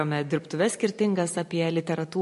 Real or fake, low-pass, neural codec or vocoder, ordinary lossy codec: fake; 14.4 kHz; vocoder, 44.1 kHz, 128 mel bands every 256 samples, BigVGAN v2; MP3, 48 kbps